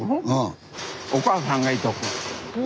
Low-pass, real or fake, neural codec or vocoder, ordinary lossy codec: none; real; none; none